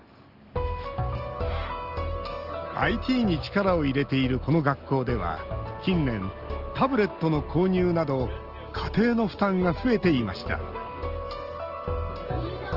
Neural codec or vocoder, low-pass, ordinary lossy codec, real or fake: none; 5.4 kHz; Opus, 16 kbps; real